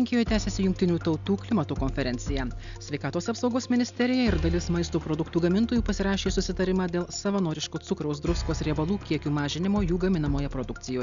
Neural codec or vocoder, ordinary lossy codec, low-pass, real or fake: none; MP3, 96 kbps; 7.2 kHz; real